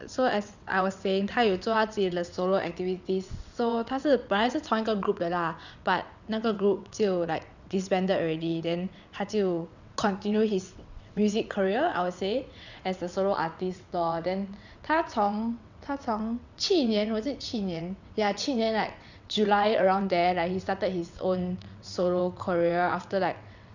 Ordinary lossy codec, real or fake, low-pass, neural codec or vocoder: none; fake; 7.2 kHz; vocoder, 22.05 kHz, 80 mel bands, WaveNeXt